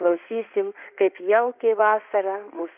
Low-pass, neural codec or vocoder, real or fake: 3.6 kHz; codec, 16 kHz in and 24 kHz out, 2.2 kbps, FireRedTTS-2 codec; fake